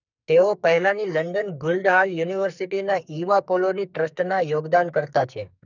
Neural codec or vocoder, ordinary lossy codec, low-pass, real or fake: codec, 44.1 kHz, 2.6 kbps, SNAC; none; 7.2 kHz; fake